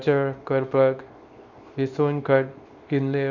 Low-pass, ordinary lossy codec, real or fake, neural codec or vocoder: 7.2 kHz; Opus, 64 kbps; fake; codec, 24 kHz, 0.9 kbps, WavTokenizer, small release